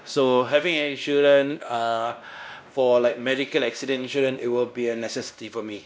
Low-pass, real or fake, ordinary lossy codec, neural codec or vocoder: none; fake; none; codec, 16 kHz, 1 kbps, X-Codec, WavLM features, trained on Multilingual LibriSpeech